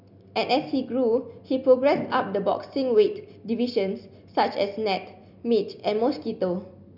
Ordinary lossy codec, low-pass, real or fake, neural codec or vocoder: none; 5.4 kHz; real; none